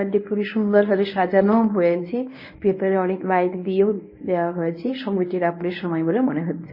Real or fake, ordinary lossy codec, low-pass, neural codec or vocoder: fake; MP3, 24 kbps; 5.4 kHz; codec, 24 kHz, 0.9 kbps, WavTokenizer, medium speech release version 1